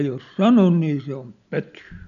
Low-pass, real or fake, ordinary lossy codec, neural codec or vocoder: 7.2 kHz; real; none; none